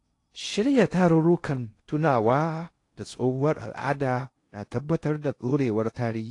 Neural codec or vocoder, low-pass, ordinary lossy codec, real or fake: codec, 16 kHz in and 24 kHz out, 0.6 kbps, FocalCodec, streaming, 2048 codes; 10.8 kHz; AAC, 48 kbps; fake